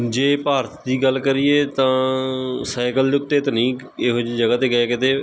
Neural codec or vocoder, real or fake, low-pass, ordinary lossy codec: none; real; none; none